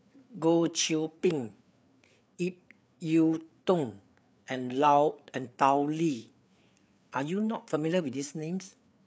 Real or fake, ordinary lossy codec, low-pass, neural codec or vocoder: fake; none; none; codec, 16 kHz, 4 kbps, FreqCodec, larger model